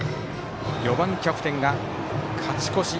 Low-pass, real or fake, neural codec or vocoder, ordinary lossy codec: none; real; none; none